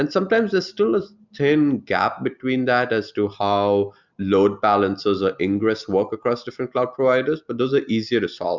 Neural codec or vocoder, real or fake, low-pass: none; real; 7.2 kHz